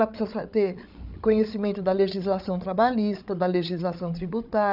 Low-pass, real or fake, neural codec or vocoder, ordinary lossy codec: 5.4 kHz; fake; codec, 16 kHz, 8 kbps, FreqCodec, larger model; none